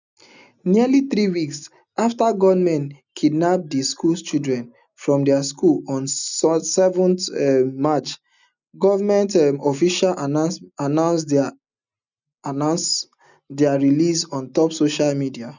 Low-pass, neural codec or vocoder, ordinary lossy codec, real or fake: 7.2 kHz; none; none; real